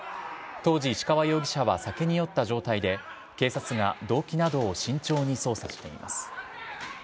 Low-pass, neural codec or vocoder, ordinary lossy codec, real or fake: none; none; none; real